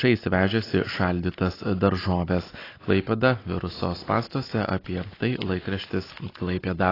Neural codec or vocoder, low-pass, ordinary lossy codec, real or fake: none; 5.4 kHz; AAC, 24 kbps; real